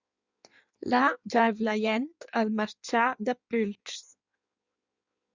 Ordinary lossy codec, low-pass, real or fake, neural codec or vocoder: Opus, 64 kbps; 7.2 kHz; fake; codec, 16 kHz in and 24 kHz out, 1.1 kbps, FireRedTTS-2 codec